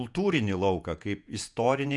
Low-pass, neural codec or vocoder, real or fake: 10.8 kHz; vocoder, 48 kHz, 128 mel bands, Vocos; fake